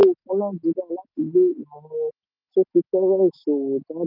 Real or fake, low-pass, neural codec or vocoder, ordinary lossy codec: real; 5.4 kHz; none; none